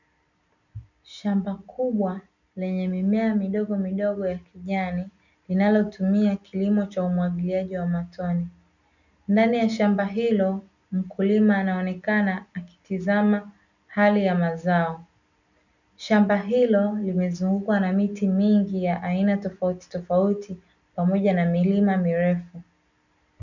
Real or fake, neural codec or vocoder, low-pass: real; none; 7.2 kHz